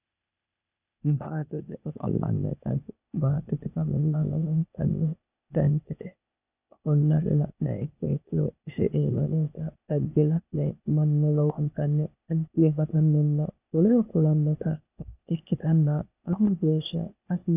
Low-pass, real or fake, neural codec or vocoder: 3.6 kHz; fake; codec, 16 kHz, 0.8 kbps, ZipCodec